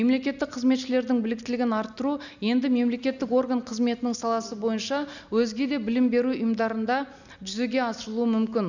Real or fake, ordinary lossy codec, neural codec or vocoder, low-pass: real; none; none; 7.2 kHz